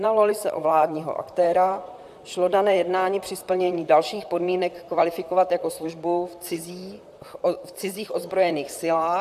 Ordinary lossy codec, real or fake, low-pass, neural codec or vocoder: AAC, 96 kbps; fake; 14.4 kHz; vocoder, 44.1 kHz, 128 mel bands, Pupu-Vocoder